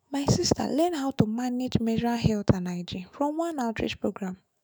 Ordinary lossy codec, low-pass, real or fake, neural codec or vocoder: none; none; fake; autoencoder, 48 kHz, 128 numbers a frame, DAC-VAE, trained on Japanese speech